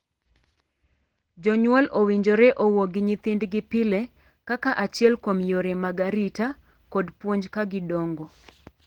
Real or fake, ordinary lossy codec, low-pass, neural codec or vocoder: real; Opus, 16 kbps; 19.8 kHz; none